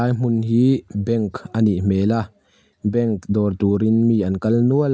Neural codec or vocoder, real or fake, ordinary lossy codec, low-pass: none; real; none; none